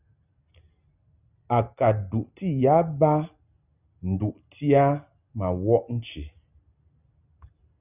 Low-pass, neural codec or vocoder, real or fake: 3.6 kHz; none; real